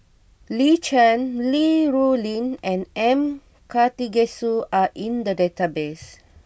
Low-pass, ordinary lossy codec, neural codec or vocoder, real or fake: none; none; none; real